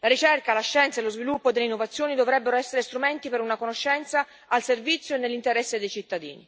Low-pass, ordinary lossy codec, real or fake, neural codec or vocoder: none; none; real; none